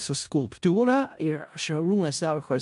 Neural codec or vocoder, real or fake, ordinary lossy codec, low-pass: codec, 16 kHz in and 24 kHz out, 0.4 kbps, LongCat-Audio-Codec, four codebook decoder; fake; AAC, 96 kbps; 10.8 kHz